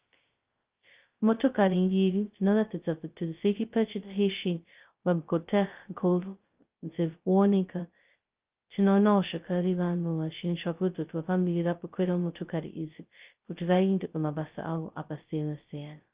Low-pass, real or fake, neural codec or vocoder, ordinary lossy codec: 3.6 kHz; fake; codec, 16 kHz, 0.2 kbps, FocalCodec; Opus, 24 kbps